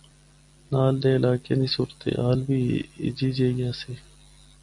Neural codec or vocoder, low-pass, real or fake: none; 10.8 kHz; real